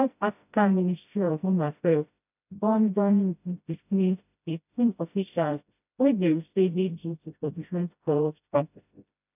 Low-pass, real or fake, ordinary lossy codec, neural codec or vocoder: 3.6 kHz; fake; none; codec, 16 kHz, 0.5 kbps, FreqCodec, smaller model